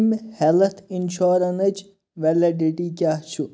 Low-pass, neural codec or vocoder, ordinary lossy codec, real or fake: none; none; none; real